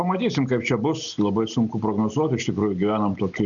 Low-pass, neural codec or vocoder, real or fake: 7.2 kHz; none; real